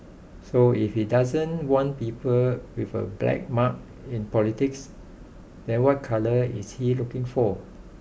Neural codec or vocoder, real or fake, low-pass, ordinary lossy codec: none; real; none; none